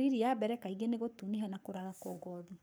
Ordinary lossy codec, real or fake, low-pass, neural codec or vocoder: none; fake; none; codec, 44.1 kHz, 7.8 kbps, Pupu-Codec